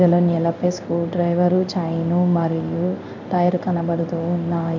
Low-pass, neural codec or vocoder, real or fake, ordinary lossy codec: 7.2 kHz; codec, 16 kHz in and 24 kHz out, 1 kbps, XY-Tokenizer; fake; none